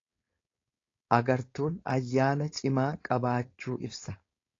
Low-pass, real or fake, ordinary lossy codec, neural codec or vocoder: 7.2 kHz; fake; AAC, 32 kbps; codec, 16 kHz, 4.8 kbps, FACodec